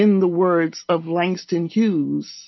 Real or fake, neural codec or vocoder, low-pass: real; none; 7.2 kHz